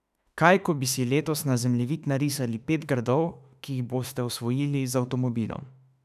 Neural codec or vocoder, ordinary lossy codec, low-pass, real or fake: autoencoder, 48 kHz, 32 numbers a frame, DAC-VAE, trained on Japanese speech; none; 14.4 kHz; fake